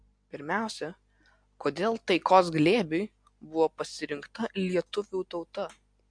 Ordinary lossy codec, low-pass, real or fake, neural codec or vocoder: MP3, 64 kbps; 9.9 kHz; real; none